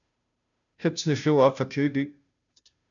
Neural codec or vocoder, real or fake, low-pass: codec, 16 kHz, 0.5 kbps, FunCodec, trained on Chinese and English, 25 frames a second; fake; 7.2 kHz